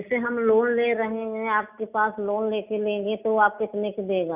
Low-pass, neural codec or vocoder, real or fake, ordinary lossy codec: 3.6 kHz; none; real; none